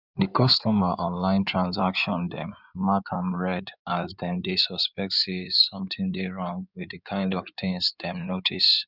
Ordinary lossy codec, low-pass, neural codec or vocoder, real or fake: none; 5.4 kHz; codec, 16 kHz in and 24 kHz out, 2.2 kbps, FireRedTTS-2 codec; fake